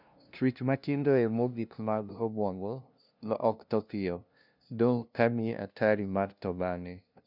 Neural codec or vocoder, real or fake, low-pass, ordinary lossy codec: codec, 16 kHz, 0.5 kbps, FunCodec, trained on LibriTTS, 25 frames a second; fake; 5.4 kHz; none